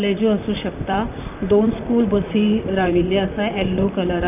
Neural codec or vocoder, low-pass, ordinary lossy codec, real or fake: vocoder, 44.1 kHz, 128 mel bands, Pupu-Vocoder; 3.6 kHz; none; fake